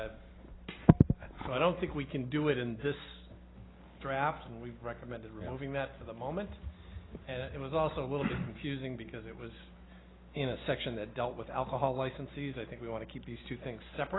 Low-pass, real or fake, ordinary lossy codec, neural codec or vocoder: 7.2 kHz; real; AAC, 16 kbps; none